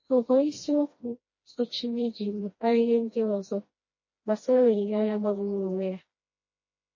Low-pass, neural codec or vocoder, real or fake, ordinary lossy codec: 7.2 kHz; codec, 16 kHz, 1 kbps, FreqCodec, smaller model; fake; MP3, 32 kbps